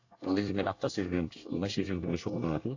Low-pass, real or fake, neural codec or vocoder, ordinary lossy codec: 7.2 kHz; fake; codec, 24 kHz, 1 kbps, SNAC; AAC, 48 kbps